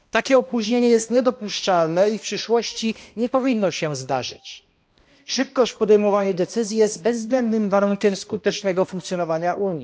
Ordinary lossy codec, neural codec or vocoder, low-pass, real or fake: none; codec, 16 kHz, 1 kbps, X-Codec, HuBERT features, trained on balanced general audio; none; fake